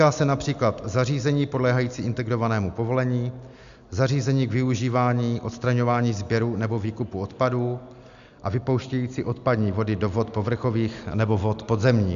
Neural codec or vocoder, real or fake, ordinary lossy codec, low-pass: none; real; AAC, 96 kbps; 7.2 kHz